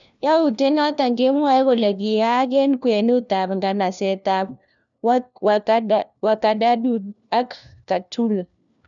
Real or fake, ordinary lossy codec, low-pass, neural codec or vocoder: fake; none; 7.2 kHz; codec, 16 kHz, 1 kbps, FunCodec, trained on LibriTTS, 50 frames a second